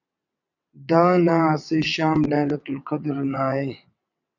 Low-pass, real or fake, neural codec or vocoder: 7.2 kHz; fake; vocoder, 22.05 kHz, 80 mel bands, WaveNeXt